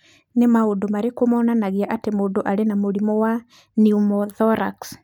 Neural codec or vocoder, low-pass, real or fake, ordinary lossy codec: none; 19.8 kHz; real; none